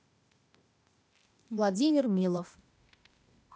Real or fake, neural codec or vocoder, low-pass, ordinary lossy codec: fake; codec, 16 kHz, 0.8 kbps, ZipCodec; none; none